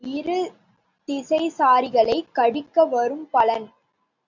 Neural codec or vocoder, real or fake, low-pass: none; real; 7.2 kHz